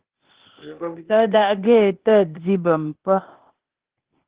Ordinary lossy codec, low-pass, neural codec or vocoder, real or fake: Opus, 16 kbps; 3.6 kHz; codec, 16 kHz, 0.8 kbps, ZipCodec; fake